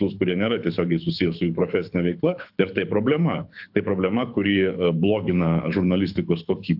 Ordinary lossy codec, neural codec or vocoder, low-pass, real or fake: AAC, 48 kbps; codec, 24 kHz, 6 kbps, HILCodec; 5.4 kHz; fake